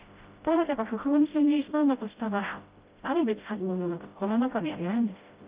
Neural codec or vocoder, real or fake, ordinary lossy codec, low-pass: codec, 16 kHz, 0.5 kbps, FreqCodec, smaller model; fake; Opus, 32 kbps; 3.6 kHz